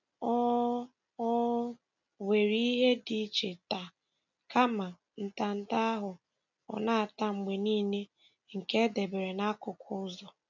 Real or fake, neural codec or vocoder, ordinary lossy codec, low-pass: real; none; none; 7.2 kHz